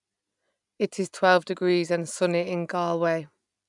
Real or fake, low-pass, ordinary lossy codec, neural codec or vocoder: real; 10.8 kHz; none; none